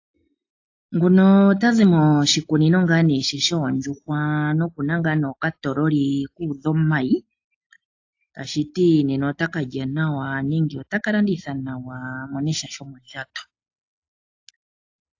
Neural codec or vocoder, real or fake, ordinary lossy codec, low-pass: none; real; AAC, 48 kbps; 7.2 kHz